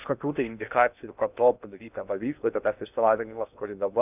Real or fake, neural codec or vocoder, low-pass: fake; codec, 16 kHz in and 24 kHz out, 0.6 kbps, FocalCodec, streaming, 2048 codes; 3.6 kHz